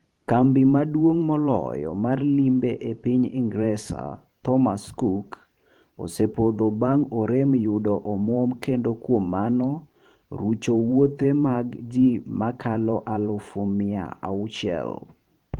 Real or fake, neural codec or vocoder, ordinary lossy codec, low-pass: fake; vocoder, 44.1 kHz, 128 mel bands every 512 samples, BigVGAN v2; Opus, 24 kbps; 19.8 kHz